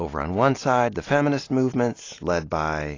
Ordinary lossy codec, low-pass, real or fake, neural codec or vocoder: AAC, 32 kbps; 7.2 kHz; real; none